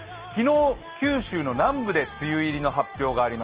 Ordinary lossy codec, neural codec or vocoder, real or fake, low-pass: Opus, 16 kbps; none; real; 3.6 kHz